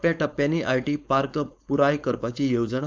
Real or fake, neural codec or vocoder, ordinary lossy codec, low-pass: fake; codec, 16 kHz, 4.8 kbps, FACodec; none; none